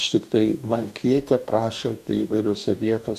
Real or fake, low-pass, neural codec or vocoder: fake; 14.4 kHz; codec, 44.1 kHz, 2.6 kbps, DAC